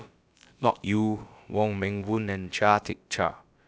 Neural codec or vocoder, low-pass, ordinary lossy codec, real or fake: codec, 16 kHz, about 1 kbps, DyCAST, with the encoder's durations; none; none; fake